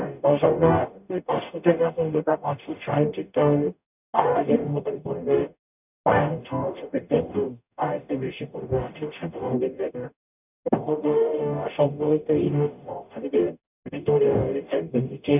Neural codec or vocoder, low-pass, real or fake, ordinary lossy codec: codec, 44.1 kHz, 0.9 kbps, DAC; 3.6 kHz; fake; none